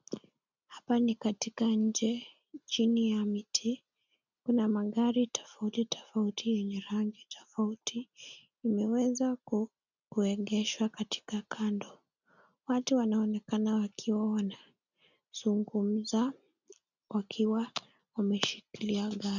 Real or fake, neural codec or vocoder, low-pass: real; none; 7.2 kHz